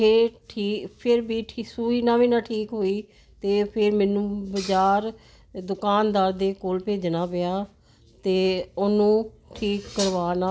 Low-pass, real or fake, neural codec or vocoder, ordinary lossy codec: none; real; none; none